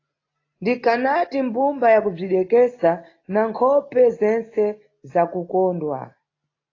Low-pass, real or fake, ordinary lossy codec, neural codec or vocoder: 7.2 kHz; real; AAC, 32 kbps; none